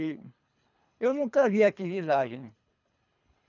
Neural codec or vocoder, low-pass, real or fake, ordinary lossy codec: codec, 24 kHz, 3 kbps, HILCodec; 7.2 kHz; fake; none